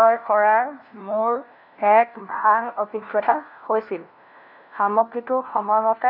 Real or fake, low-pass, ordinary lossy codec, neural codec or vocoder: fake; 5.4 kHz; none; codec, 16 kHz, 1 kbps, FunCodec, trained on LibriTTS, 50 frames a second